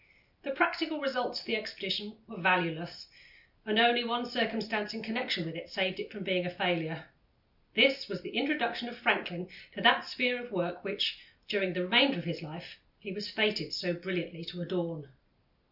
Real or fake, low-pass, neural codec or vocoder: real; 5.4 kHz; none